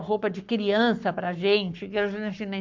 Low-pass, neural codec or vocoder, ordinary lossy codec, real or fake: 7.2 kHz; codec, 44.1 kHz, 7.8 kbps, Pupu-Codec; MP3, 64 kbps; fake